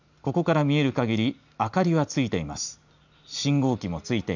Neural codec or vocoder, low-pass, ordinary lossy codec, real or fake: none; 7.2 kHz; none; real